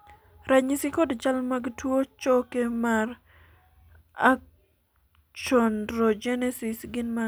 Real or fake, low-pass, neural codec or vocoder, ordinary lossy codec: real; none; none; none